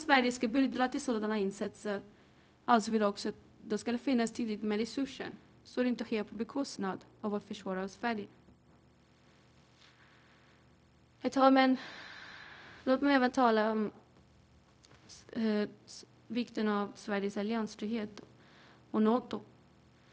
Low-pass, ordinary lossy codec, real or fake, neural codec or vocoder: none; none; fake; codec, 16 kHz, 0.4 kbps, LongCat-Audio-Codec